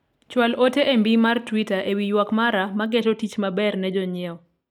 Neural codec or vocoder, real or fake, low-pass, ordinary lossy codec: none; real; 19.8 kHz; none